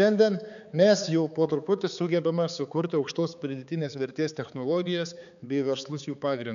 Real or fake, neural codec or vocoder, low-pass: fake; codec, 16 kHz, 4 kbps, X-Codec, HuBERT features, trained on balanced general audio; 7.2 kHz